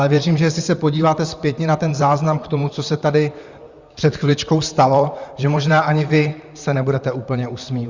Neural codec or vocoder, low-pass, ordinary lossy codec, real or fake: vocoder, 44.1 kHz, 128 mel bands, Pupu-Vocoder; 7.2 kHz; Opus, 64 kbps; fake